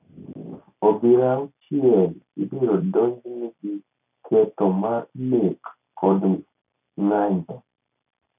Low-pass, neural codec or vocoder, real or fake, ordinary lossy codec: 3.6 kHz; none; real; none